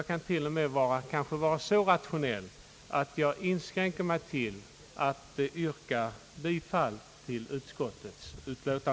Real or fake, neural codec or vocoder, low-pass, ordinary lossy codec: real; none; none; none